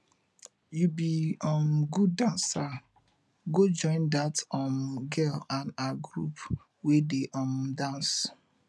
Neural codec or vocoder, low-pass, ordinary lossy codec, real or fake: none; none; none; real